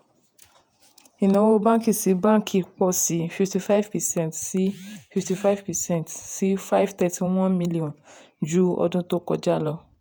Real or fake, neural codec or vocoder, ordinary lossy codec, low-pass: fake; vocoder, 48 kHz, 128 mel bands, Vocos; none; none